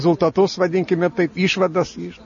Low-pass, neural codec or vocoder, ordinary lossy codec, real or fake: 7.2 kHz; none; MP3, 32 kbps; real